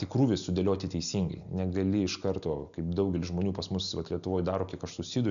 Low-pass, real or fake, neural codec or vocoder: 7.2 kHz; real; none